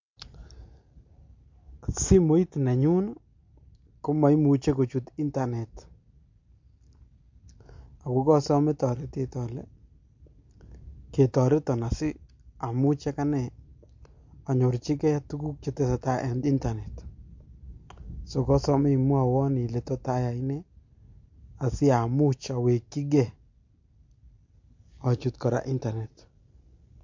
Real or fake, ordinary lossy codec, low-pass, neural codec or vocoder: real; MP3, 48 kbps; 7.2 kHz; none